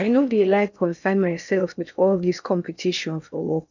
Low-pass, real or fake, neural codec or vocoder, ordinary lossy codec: 7.2 kHz; fake; codec, 16 kHz in and 24 kHz out, 0.6 kbps, FocalCodec, streaming, 4096 codes; none